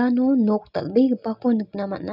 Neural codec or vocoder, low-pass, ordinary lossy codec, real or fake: none; 5.4 kHz; none; real